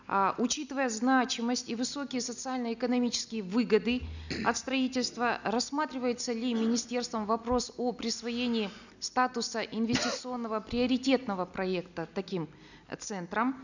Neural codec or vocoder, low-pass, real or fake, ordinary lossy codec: none; 7.2 kHz; real; none